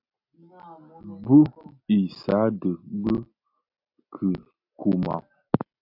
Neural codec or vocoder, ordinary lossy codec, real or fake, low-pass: none; AAC, 48 kbps; real; 5.4 kHz